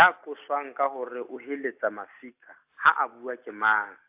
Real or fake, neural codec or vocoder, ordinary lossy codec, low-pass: real; none; AAC, 32 kbps; 3.6 kHz